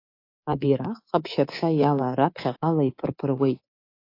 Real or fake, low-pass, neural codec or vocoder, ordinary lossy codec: fake; 5.4 kHz; codec, 24 kHz, 3.1 kbps, DualCodec; AAC, 32 kbps